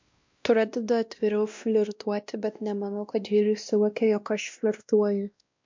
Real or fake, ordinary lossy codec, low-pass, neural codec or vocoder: fake; MP3, 64 kbps; 7.2 kHz; codec, 16 kHz, 1 kbps, X-Codec, WavLM features, trained on Multilingual LibriSpeech